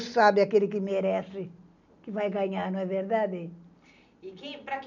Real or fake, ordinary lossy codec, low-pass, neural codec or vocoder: real; none; 7.2 kHz; none